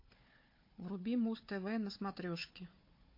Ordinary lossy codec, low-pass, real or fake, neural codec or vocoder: MP3, 32 kbps; 5.4 kHz; fake; codec, 16 kHz, 4 kbps, FunCodec, trained on Chinese and English, 50 frames a second